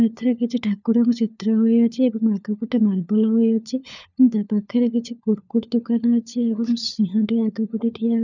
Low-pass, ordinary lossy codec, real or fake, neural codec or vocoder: 7.2 kHz; none; fake; codec, 16 kHz, 4 kbps, FunCodec, trained on LibriTTS, 50 frames a second